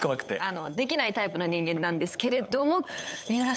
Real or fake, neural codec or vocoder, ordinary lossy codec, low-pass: fake; codec, 16 kHz, 8 kbps, FunCodec, trained on LibriTTS, 25 frames a second; none; none